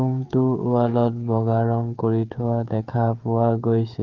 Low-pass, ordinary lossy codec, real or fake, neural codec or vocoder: 7.2 kHz; Opus, 32 kbps; fake; codec, 16 kHz, 16 kbps, FreqCodec, smaller model